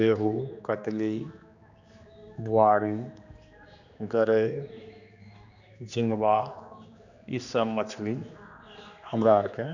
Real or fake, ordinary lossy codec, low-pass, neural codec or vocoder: fake; none; 7.2 kHz; codec, 16 kHz, 2 kbps, X-Codec, HuBERT features, trained on general audio